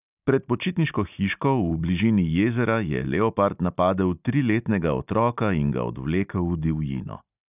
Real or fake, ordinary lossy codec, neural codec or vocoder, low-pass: real; none; none; 3.6 kHz